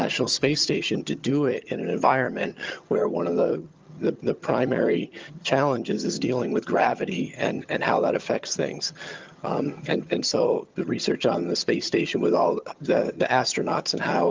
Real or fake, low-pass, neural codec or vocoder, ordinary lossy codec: fake; 7.2 kHz; vocoder, 22.05 kHz, 80 mel bands, HiFi-GAN; Opus, 24 kbps